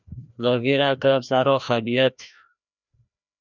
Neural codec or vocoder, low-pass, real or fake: codec, 16 kHz, 1 kbps, FreqCodec, larger model; 7.2 kHz; fake